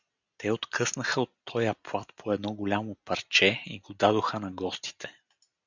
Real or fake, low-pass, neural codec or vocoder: real; 7.2 kHz; none